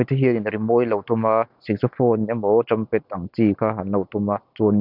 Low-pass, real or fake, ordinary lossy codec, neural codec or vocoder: 5.4 kHz; real; none; none